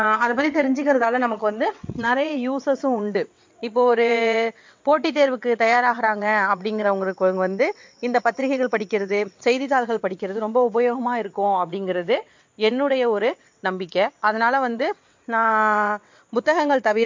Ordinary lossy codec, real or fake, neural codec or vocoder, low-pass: MP3, 48 kbps; fake; vocoder, 22.05 kHz, 80 mel bands, WaveNeXt; 7.2 kHz